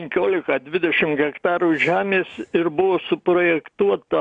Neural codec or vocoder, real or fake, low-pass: none; real; 9.9 kHz